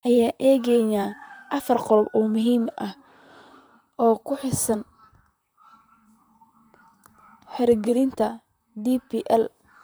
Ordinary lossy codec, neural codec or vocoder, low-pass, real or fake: none; vocoder, 44.1 kHz, 128 mel bands, Pupu-Vocoder; none; fake